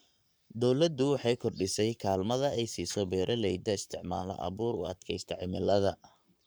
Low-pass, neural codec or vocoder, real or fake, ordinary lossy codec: none; codec, 44.1 kHz, 7.8 kbps, Pupu-Codec; fake; none